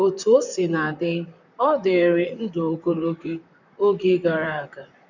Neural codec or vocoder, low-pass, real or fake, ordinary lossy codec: vocoder, 44.1 kHz, 128 mel bands, Pupu-Vocoder; 7.2 kHz; fake; none